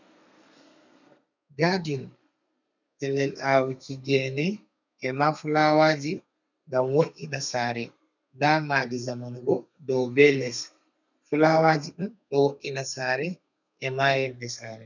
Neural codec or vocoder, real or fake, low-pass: codec, 32 kHz, 1.9 kbps, SNAC; fake; 7.2 kHz